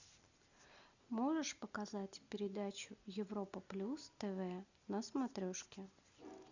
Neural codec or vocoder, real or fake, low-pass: none; real; 7.2 kHz